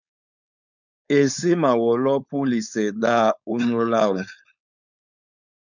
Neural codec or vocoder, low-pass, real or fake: codec, 16 kHz, 4.8 kbps, FACodec; 7.2 kHz; fake